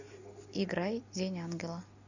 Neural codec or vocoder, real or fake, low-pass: none; real; 7.2 kHz